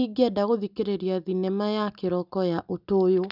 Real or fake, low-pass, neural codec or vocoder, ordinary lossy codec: real; 5.4 kHz; none; none